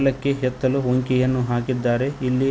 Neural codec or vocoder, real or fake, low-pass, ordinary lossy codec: none; real; none; none